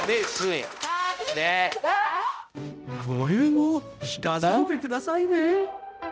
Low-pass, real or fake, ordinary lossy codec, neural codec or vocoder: none; fake; none; codec, 16 kHz, 0.5 kbps, X-Codec, HuBERT features, trained on balanced general audio